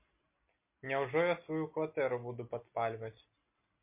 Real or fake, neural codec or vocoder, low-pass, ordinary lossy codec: real; none; 3.6 kHz; MP3, 24 kbps